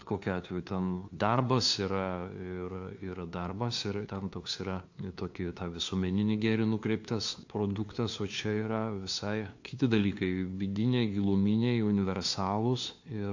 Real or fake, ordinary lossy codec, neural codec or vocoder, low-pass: fake; AAC, 48 kbps; codec, 16 kHz, 2 kbps, FunCodec, trained on LibriTTS, 25 frames a second; 7.2 kHz